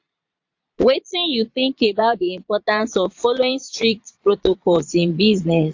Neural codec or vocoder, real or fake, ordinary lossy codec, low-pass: vocoder, 22.05 kHz, 80 mel bands, Vocos; fake; AAC, 48 kbps; 7.2 kHz